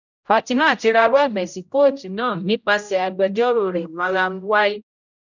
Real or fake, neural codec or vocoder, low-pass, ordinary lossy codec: fake; codec, 16 kHz, 0.5 kbps, X-Codec, HuBERT features, trained on general audio; 7.2 kHz; none